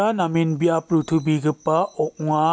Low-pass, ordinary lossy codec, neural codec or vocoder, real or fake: none; none; none; real